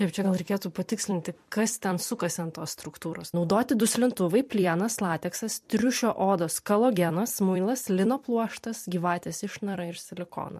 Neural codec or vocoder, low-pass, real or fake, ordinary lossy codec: vocoder, 44.1 kHz, 128 mel bands every 256 samples, BigVGAN v2; 14.4 kHz; fake; MP3, 64 kbps